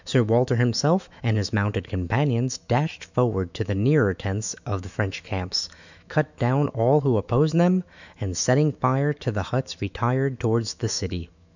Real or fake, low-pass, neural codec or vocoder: fake; 7.2 kHz; autoencoder, 48 kHz, 128 numbers a frame, DAC-VAE, trained on Japanese speech